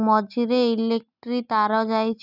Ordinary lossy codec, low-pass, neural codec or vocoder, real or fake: none; 5.4 kHz; none; real